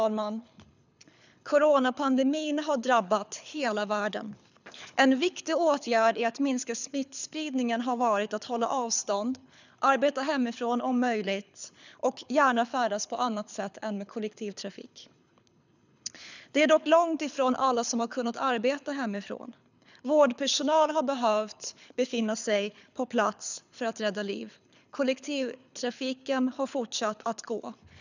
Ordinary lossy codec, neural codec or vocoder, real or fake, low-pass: none; codec, 24 kHz, 6 kbps, HILCodec; fake; 7.2 kHz